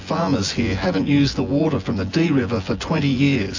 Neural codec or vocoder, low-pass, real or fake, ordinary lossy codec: vocoder, 24 kHz, 100 mel bands, Vocos; 7.2 kHz; fake; AAC, 48 kbps